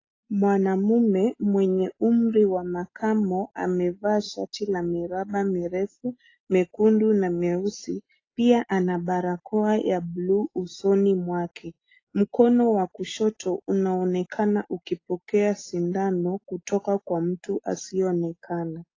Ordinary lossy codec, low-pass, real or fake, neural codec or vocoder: AAC, 32 kbps; 7.2 kHz; real; none